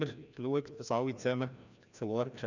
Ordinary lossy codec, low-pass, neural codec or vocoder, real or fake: none; 7.2 kHz; codec, 16 kHz, 1 kbps, FreqCodec, larger model; fake